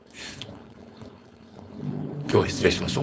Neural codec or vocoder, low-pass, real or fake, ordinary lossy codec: codec, 16 kHz, 4.8 kbps, FACodec; none; fake; none